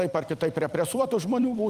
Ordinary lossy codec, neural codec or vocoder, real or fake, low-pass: Opus, 32 kbps; vocoder, 44.1 kHz, 128 mel bands every 256 samples, BigVGAN v2; fake; 14.4 kHz